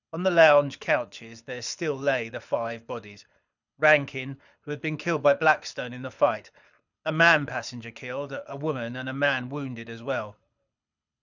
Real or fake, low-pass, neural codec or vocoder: fake; 7.2 kHz; codec, 24 kHz, 6 kbps, HILCodec